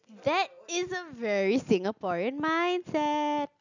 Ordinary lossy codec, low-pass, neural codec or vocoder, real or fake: none; 7.2 kHz; none; real